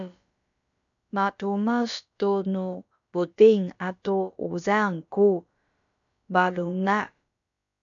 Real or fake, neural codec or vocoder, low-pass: fake; codec, 16 kHz, about 1 kbps, DyCAST, with the encoder's durations; 7.2 kHz